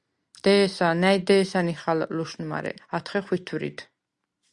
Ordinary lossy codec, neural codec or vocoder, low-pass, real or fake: Opus, 64 kbps; none; 10.8 kHz; real